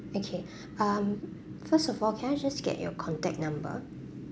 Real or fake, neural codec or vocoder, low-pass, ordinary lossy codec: real; none; none; none